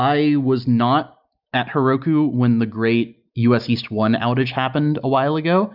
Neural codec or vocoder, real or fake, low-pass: none; real; 5.4 kHz